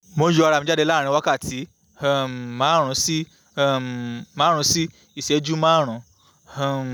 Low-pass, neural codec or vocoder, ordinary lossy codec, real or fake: none; none; none; real